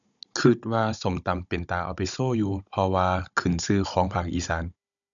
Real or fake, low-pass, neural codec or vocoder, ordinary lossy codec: fake; 7.2 kHz; codec, 16 kHz, 16 kbps, FunCodec, trained on Chinese and English, 50 frames a second; none